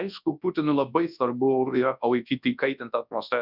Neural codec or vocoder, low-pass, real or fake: codec, 24 kHz, 0.9 kbps, WavTokenizer, large speech release; 5.4 kHz; fake